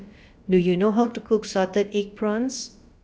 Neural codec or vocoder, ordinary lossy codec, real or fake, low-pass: codec, 16 kHz, about 1 kbps, DyCAST, with the encoder's durations; none; fake; none